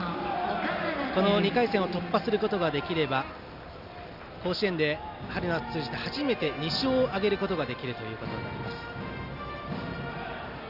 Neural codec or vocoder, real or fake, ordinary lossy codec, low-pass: none; real; none; 5.4 kHz